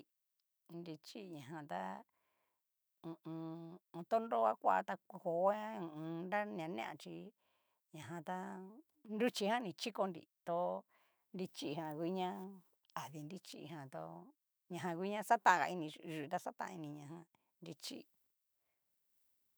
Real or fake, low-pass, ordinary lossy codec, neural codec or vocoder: fake; none; none; autoencoder, 48 kHz, 128 numbers a frame, DAC-VAE, trained on Japanese speech